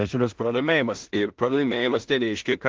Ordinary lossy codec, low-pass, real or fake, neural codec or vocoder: Opus, 16 kbps; 7.2 kHz; fake; codec, 16 kHz in and 24 kHz out, 0.4 kbps, LongCat-Audio-Codec, two codebook decoder